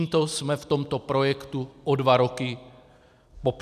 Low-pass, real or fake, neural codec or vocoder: 14.4 kHz; real; none